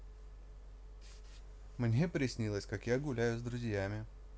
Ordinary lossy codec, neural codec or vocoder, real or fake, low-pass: none; none; real; none